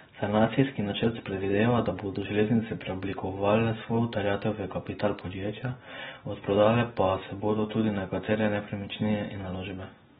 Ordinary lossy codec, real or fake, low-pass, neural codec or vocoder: AAC, 16 kbps; real; 19.8 kHz; none